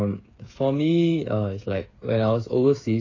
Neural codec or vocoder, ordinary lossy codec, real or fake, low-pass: codec, 16 kHz, 8 kbps, FreqCodec, smaller model; AAC, 32 kbps; fake; 7.2 kHz